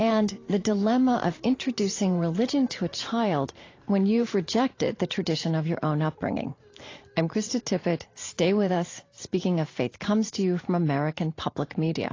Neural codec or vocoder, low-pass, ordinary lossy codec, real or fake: vocoder, 44.1 kHz, 128 mel bands every 512 samples, BigVGAN v2; 7.2 kHz; AAC, 32 kbps; fake